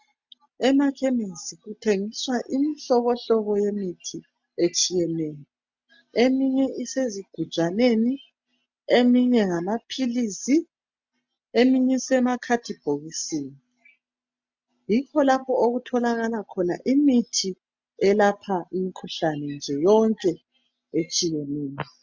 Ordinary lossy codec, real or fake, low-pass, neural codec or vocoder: MP3, 64 kbps; real; 7.2 kHz; none